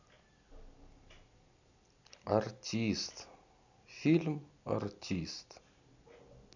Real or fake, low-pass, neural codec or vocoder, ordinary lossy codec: real; 7.2 kHz; none; none